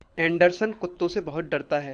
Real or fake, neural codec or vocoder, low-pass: fake; codec, 24 kHz, 6 kbps, HILCodec; 9.9 kHz